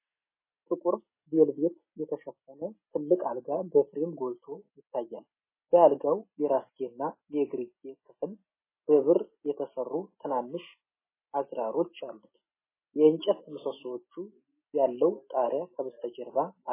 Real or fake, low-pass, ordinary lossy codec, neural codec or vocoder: real; 3.6 kHz; MP3, 16 kbps; none